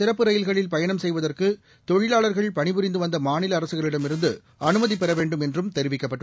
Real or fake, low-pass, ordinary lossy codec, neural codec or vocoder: real; none; none; none